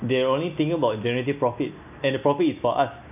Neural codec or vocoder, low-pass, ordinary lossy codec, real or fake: none; 3.6 kHz; none; real